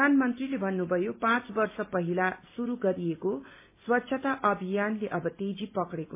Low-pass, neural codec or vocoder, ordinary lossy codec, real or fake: 3.6 kHz; none; MP3, 24 kbps; real